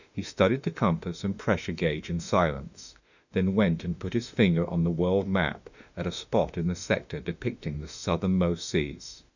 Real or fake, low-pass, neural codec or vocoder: fake; 7.2 kHz; autoencoder, 48 kHz, 32 numbers a frame, DAC-VAE, trained on Japanese speech